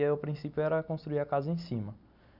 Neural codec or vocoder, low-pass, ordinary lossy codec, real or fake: none; 5.4 kHz; none; real